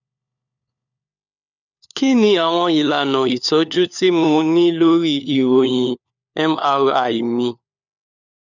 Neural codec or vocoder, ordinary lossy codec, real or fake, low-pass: codec, 16 kHz, 4 kbps, FunCodec, trained on LibriTTS, 50 frames a second; none; fake; 7.2 kHz